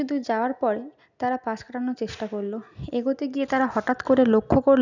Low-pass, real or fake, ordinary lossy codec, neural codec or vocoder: 7.2 kHz; real; none; none